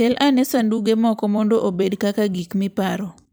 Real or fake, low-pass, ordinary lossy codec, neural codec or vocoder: fake; none; none; vocoder, 44.1 kHz, 128 mel bands every 256 samples, BigVGAN v2